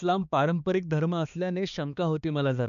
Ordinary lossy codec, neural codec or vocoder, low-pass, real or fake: none; codec, 16 kHz, 2 kbps, X-Codec, HuBERT features, trained on balanced general audio; 7.2 kHz; fake